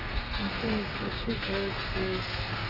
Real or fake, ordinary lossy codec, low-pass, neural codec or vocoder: fake; Opus, 32 kbps; 5.4 kHz; codec, 32 kHz, 1.9 kbps, SNAC